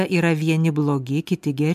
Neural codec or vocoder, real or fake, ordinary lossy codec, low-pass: none; real; MP3, 96 kbps; 14.4 kHz